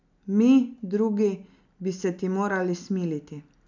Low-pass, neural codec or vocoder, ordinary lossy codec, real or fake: 7.2 kHz; none; none; real